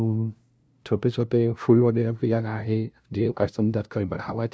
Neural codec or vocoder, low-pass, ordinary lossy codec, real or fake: codec, 16 kHz, 0.5 kbps, FunCodec, trained on LibriTTS, 25 frames a second; none; none; fake